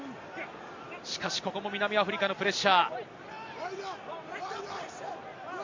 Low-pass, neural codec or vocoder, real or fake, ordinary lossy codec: 7.2 kHz; none; real; MP3, 48 kbps